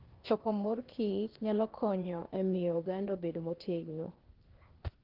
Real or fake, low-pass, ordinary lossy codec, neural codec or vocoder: fake; 5.4 kHz; Opus, 16 kbps; codec, 16 kHz, 0.8 kbps, ZipCodec